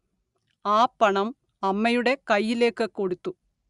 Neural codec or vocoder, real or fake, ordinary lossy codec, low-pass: none; real; Opus, 64 kbps; 10.8 kHz